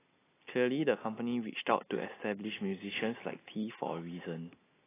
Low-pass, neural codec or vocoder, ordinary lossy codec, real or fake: 3.6 kHz; none; AAC, 16 kbps; real